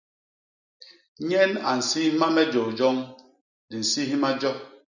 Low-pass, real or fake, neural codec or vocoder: 7.2 kHz; real; none